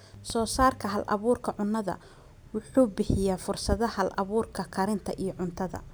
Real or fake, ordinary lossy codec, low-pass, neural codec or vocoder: real; none; none; none